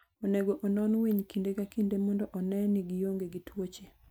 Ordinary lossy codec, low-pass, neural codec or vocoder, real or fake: none; none; none; real